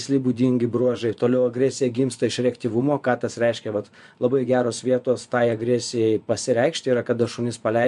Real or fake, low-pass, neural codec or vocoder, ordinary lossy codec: fake; 10.8 kHz; vocoder, 24 kHz, 100 mel bands, Vocos; MP3, 64 kbps